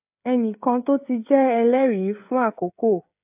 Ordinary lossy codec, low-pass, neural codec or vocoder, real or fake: AAC, 32 kbps; 3.6 kHz; codec, 16 kHz, 16 kbps, FreqCodec, smaller model; fake